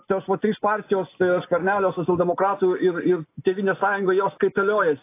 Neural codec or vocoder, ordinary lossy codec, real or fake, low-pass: none; AAC, 24 kbps; real; 3.6 kHz